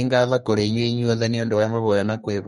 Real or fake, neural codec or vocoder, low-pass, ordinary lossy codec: fake; codec, 32 kHz, 1.9 kbps, SNAC; 14.4 kHz; MP3, 48 kbps